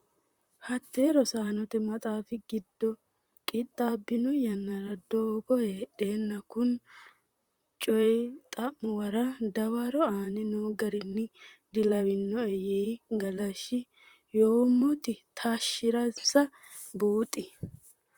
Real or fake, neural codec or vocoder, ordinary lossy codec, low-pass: fake; vocoder, 44.1 kHz, 128 mel bands, Pupu-Vocoder; Opus, 64 kbps; 19.8 kHz